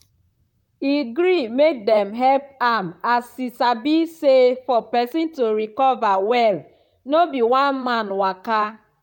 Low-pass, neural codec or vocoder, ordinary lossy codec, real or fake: 19.8 kHz; vocoder, 44.1 kHz, 128 mel bands, Pupu-Vocoder; none; fake